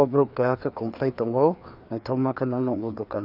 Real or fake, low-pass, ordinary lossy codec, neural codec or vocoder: fake; 5.4 kHz; none; codec, 16 kHz, 2 kbps, FreqCodec, larger model